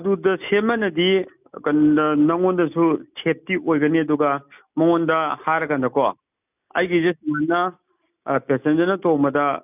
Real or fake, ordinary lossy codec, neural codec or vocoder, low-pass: real; none; none; 3.6 kHz